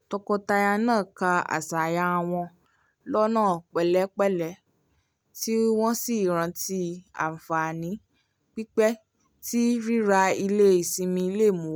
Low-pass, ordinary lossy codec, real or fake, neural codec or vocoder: none; none; real; none